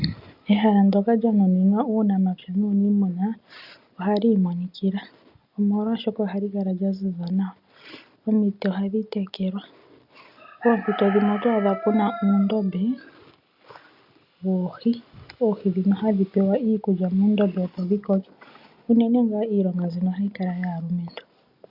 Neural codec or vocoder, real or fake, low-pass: none; real; 5.4 kHz